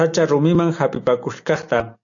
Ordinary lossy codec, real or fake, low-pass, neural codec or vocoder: AAC, 64 kbps; real; 7.2 kHz; none